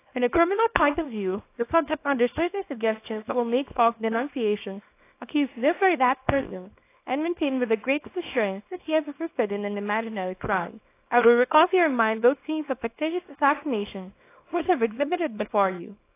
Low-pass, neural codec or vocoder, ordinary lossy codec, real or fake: 3.6 kHz; codec, 24 kHz, 0.9 kbps, WavTokenizer, small release; AAC, 24 kbps; fake